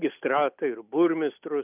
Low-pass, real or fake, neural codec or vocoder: 3.6 kHz; real; none